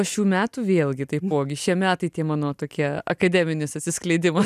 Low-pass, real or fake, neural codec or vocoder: 14.4 kHz; real; none